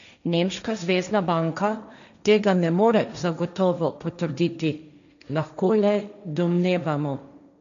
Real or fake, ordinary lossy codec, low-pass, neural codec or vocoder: fake; none; 7.2 kHz; codec, 16 kHz, 1.1 kbps, Voila-Tokenizer